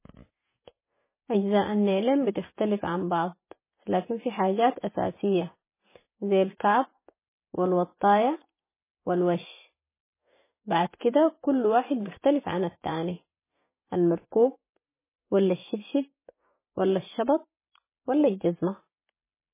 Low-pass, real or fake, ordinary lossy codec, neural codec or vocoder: 3.6 kHz; real; MP3, 16 kbps; none